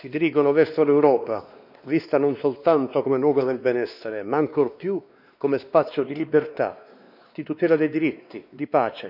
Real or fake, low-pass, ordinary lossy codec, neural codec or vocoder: fake; 5.4 kHz; none; codec, 16 kHz, 2 kbps, X-Codec, WavLM features, trained on Multilingual LibriSpeech